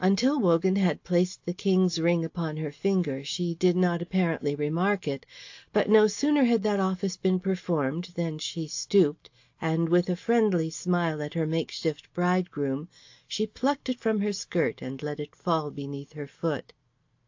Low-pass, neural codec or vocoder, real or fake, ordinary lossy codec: 7.2 kHz; none; real; AAC, 48 kbps